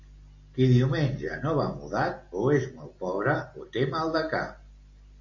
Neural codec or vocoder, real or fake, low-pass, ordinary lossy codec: none; real; 7.2 kHz; MP3, 48 kbps